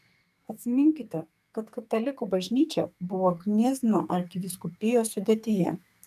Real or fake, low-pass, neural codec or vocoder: fake; 14.4 kHz; codec, 44.1 kHz, 2.6 kbps, SNAC